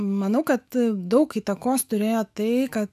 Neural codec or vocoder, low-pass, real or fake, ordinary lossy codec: autoencoder, 48 kHz, 128 numbers a frame, DAC-VAE, trained on Japanese speech; 14.4 kHz; fake; AAC, 96 kbps